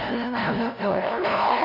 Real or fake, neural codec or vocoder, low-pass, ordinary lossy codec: fake; codec, 16 kHz, 0.5 kbps, FunCodec, trained on LibriTTS, 25 frames a second; 5.4 kHz; none